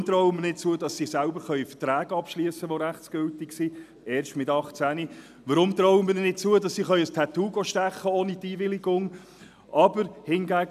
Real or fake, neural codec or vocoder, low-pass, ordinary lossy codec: real; none; 14.4 kHz; none